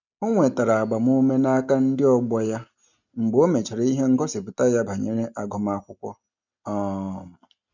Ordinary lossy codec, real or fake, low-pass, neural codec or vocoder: none; real; 7.2 kHz; none